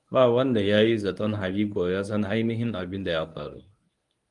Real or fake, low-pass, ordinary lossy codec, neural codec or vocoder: fake; 10.8 kHz; Opus, 32 kbps; codec, 24 kHz, 0.9 kbps, WavTokenizer, medium speech release version 1